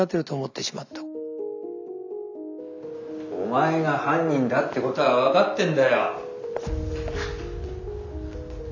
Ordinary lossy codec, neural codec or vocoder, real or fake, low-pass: none; none; real; 7.2 kHz